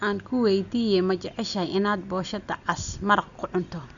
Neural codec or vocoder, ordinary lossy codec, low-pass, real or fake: none; none; 7.2 kHz; real